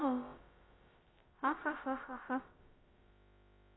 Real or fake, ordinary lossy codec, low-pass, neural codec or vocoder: fake; AAC, 16 kbps; 7.2 kHz; codec, 16 kHz, about 1 kbps, DyCAST, with the encoder's durations